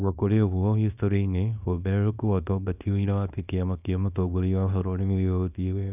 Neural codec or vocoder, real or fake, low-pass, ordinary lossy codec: codec, 24 kHz, 0.9 kbps, WavTokenizer, small release; fake; 3.6 kHz; none